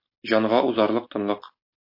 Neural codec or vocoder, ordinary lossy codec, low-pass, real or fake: none; MP3, 32 kbps; 5.4 kHz; real